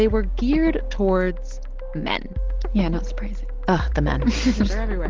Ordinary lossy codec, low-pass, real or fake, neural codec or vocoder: Opus, 16 kbps; 7.2 kHz; real; none